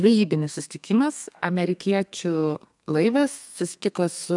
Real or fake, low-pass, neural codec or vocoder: fake; 10.8 kHz; codec, 32 kHz, 1.9 kbps, SNAC